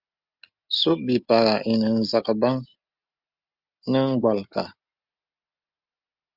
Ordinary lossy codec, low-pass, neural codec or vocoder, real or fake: Opus, 64 kbps; 5.4 kHz; none; real